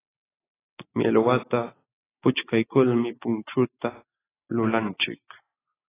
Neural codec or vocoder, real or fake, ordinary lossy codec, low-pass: none; real; AAC, 16 kbps; 3.6 kHz